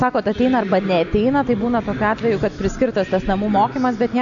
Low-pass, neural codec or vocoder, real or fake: 7.2 kHz; none; real